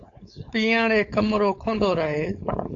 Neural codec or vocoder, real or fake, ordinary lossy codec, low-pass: codec, 16 kHz, 4.8 kbps, FACodec; fake; AAC, 64 kbps; 7.2 kHz